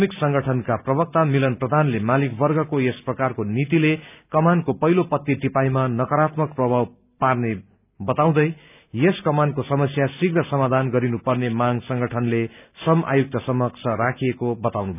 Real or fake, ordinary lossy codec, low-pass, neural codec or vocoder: real; none; 3.6 kHz; none